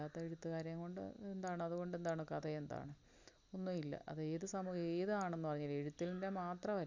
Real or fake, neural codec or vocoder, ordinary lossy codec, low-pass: real; none; Opus, 64 kbps; 7.2 kHz